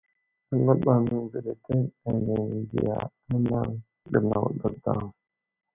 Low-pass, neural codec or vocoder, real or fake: 3.6 kHz; none; real